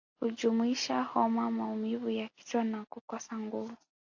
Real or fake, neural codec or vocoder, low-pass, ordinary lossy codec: real; none; 7.2 kHz; AAC, 48 kbps